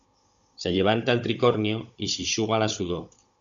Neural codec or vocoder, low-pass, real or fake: codec, 16 kHz, 16 kbps, FunCodec, trained on Chinese and English, 50 frames a second; 7.2 kHz; fake